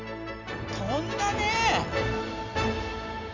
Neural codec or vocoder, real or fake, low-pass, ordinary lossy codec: none; real; 7.2 kHz; none